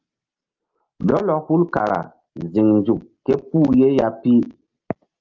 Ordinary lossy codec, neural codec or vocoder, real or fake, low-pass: Opus, 32 kbps; none; real; 7.2 kHz